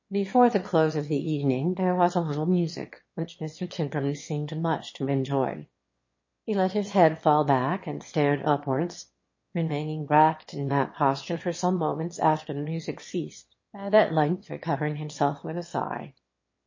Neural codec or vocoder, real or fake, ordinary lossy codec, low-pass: autoencoder, 22.05 kHz, a latent of 192 numbers a frame, VITS, trained on one speaker; fake; MP3, 32 kbps; 7.2 kHz